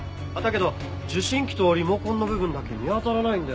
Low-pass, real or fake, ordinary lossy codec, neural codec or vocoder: none; real; none; none